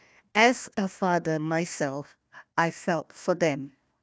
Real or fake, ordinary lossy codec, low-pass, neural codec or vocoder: fake; none; none; codec, 16 kHz, 1 kbps, FunCodec, trained on Chinese and English, 50 frames a second